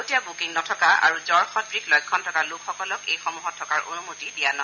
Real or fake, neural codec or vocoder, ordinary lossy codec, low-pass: real; none; none; 7.2 kHz